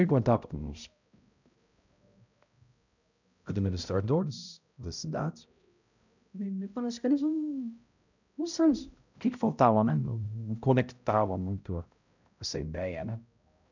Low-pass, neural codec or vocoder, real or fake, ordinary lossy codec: 7.2 kHz; codec, 16 kHz, 0.5 kbps, X-Codec, HuBERT features, trained on balanced general audio; fake; none